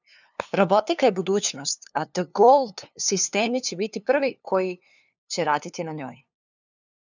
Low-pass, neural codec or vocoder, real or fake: 7.2 kHz; codec, 16 kHz, 2 kbps, FunCodec, trained on LibriTTS, 25 frames a second; fake